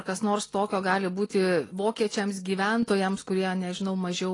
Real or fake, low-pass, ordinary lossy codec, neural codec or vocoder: real; 10.8 kHz; AAC, 32 kbps; none